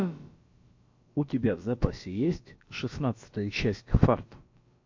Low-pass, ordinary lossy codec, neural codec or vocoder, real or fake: 7.2 kHz; MP3, 48 kbps; codec, 16 kHz, about 1 kbps, DyCAST, with the encoder's durations; fake